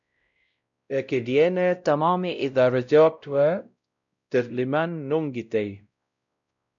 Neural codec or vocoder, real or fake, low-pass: codec, 16 kHz, 0.5 kbps, X-Codec, WavLM features, trained on Multilingual LibriSpeech; fake; 7.2 kHz